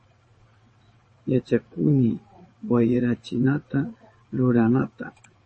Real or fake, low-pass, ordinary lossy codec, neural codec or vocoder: fake; 9.9 kHz; MP3, 32 kbps; vocoder, 22.05 kHz, 80 mel bands, WaveNeXt